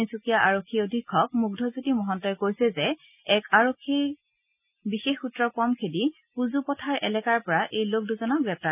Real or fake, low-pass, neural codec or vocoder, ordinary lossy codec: real; 3.6 kHz; none; none